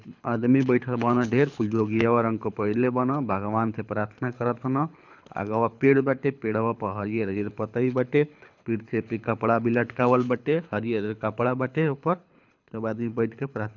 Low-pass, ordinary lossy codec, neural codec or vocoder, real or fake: 7.2 kHz; none; codec, 24 kHz, 6 kbps, HILCodec; fake